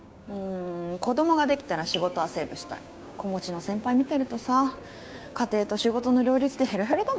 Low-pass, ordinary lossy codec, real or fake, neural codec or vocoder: none; none; fake; codec, 16 kHz, 6 kbps, DAC